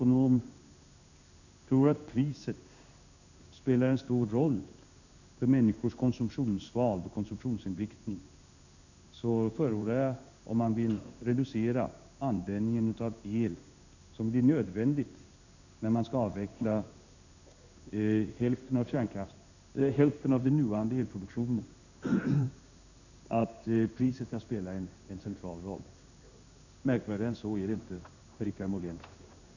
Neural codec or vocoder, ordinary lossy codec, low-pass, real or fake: codec, 16 kHz in and 24 kHz out, 1 kbps, XY-Tokenizer; none; 7.2 kHz; fake